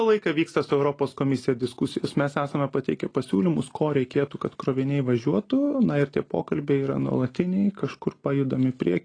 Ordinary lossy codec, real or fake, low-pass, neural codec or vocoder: AAC, 32 kbps; real; 9.9 kHz; none